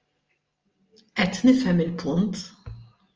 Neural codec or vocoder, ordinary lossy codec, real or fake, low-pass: none; Opus, 24 kbps; real; 7.2 kHz